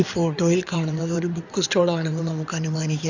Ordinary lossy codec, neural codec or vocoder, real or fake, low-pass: none; codec, 16 kHz in and 24 kHz out, 2.2 kbps, FireRedTTS-2 codec; fake; 7.2 kHz